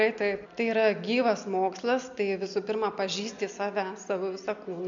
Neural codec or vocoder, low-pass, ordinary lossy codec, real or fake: none; 7.2 kHz; MP3, 64 kbps; real